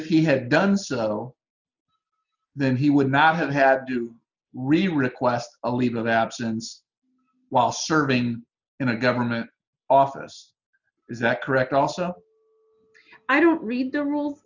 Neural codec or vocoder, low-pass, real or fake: none; 7.2 kHz; real